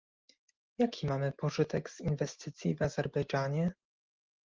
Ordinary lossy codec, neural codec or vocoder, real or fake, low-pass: Opus, 32 kbps; none; real; 7.2 kHz